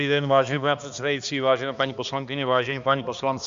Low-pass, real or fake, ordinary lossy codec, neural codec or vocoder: 7.2 kHz; fake; Opus, 64 kbps; codec, 16 kHz, 2 kbps, X-Codec, HuBERT features, trained on balanced general audio